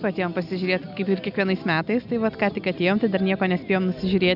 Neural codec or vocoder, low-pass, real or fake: none; 5.4 kHz; real